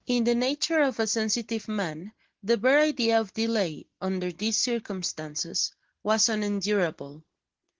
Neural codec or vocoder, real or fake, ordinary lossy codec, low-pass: none; real; Opus, 16 kbps; 7.2 kHz